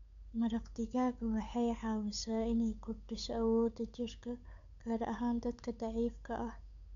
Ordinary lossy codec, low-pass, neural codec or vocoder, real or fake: none; 7.2 kHz; codec, 16 kHz, 8 kbps, FunCodec, trained on Chinese and English, 25 frames a second; fake